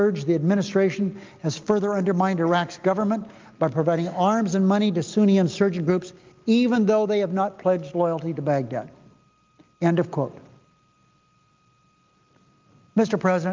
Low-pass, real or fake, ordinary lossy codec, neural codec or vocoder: 7.2 kHz; real; Opus, 24 kbps; none